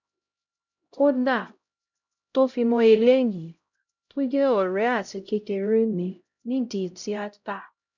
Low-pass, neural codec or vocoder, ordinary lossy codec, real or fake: 7.2 kHz; codec, 16 kHz, 0.5 kbps, X-Codec, HuBERT features, trained on LibriSpeech; none; fake